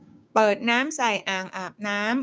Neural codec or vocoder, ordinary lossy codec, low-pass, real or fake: codec, 16 kHz, 6 kbps, DAC; none; none; fake